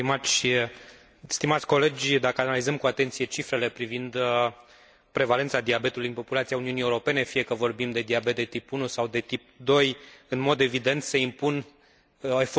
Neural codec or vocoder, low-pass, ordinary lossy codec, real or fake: none; none; none; real